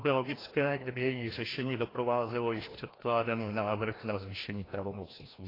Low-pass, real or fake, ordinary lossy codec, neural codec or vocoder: 5.4 kHz; fake; AAC, 24 kbps; codec, 16 kHz, 1 kbps, FreqCodec, larger model